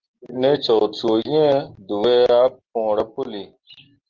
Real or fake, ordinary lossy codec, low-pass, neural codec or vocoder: real; Opus, 16 kbps; 7.2 kHz; none